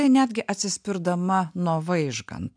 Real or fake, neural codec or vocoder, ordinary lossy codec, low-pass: real; none; MP3, 96 kbps; 9.9 kHz